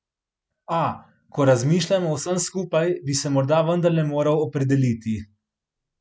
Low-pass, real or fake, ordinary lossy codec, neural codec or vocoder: none; real; none; none